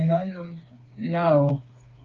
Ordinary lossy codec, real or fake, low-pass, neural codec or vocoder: Opus, 32 kbps; fake; 7.2 kHz; codec, 16 kHz, 4 kbps, FreqCodec, smaller model